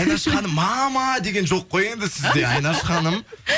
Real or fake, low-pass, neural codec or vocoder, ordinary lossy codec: real; none; none; none